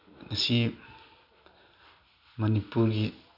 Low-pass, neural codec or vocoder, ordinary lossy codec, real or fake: 5.4 kHz; none; none; real